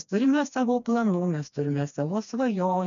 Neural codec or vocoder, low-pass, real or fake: codec, 16 kHz, 2 kbps, FreqCodec, smaller model; 7.2 kHz; fake